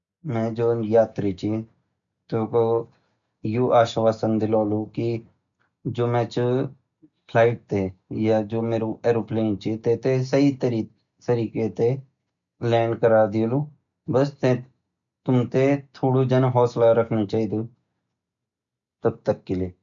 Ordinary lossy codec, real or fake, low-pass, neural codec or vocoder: none; real; 7.2 kHz; none